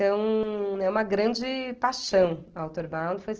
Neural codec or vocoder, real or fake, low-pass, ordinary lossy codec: none; real; 7.2 kHz; Opus, 16 kbps